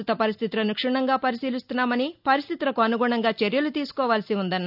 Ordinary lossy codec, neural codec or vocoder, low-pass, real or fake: none; none; 5.4 kHz; real